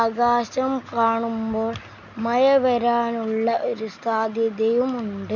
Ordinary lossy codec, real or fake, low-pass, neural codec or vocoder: none; real; 7.2 kHz; none